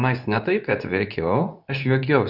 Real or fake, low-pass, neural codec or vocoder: fake; 5.4 kHz; codec, 24 kHz, 0.9 kbps, WavTokenizer, medium speech release version 2